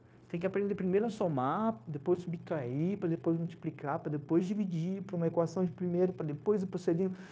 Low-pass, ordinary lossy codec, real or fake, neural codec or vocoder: none; none; fake; codec, 16 kHz, 0.9 kbps, LongCat-Audio-Codec